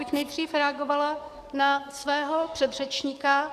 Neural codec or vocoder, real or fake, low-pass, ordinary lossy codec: codec, 44.1 kHz, 7.8 kbps, DAC; fake; 14.4 kHz; Opus, 64 kbps